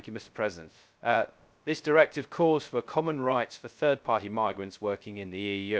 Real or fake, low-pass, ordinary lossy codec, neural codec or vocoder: fake; none; none; codec, 16 kHz, 0.3 kbps, FocalCodec